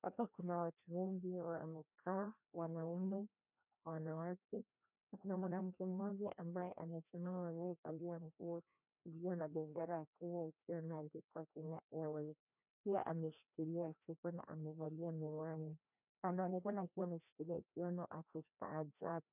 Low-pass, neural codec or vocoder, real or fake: 3.6 kHz; codec, 16 kHz, 1 kbps, FreqCodec, larger model; fake